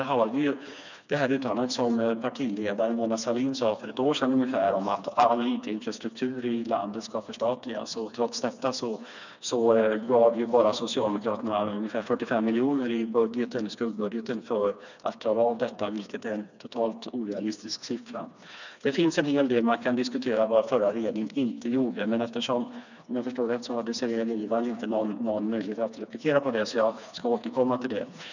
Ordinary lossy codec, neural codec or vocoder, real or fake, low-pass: none; codec, 16 kHz, 2 kbps, FreqCodec, smaller model; fake; 7.2 kHz